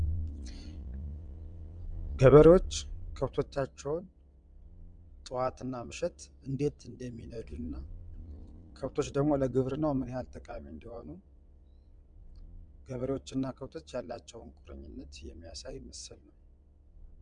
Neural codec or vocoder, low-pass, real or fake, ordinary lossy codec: vocoder, 22.05 kHz, 80 mel bands, Vocos; 9.9 kHz; fake; MP3, 96 kbps